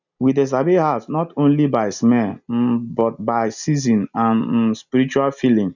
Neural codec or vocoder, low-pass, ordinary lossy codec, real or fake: none; 7.2 kHz; none; real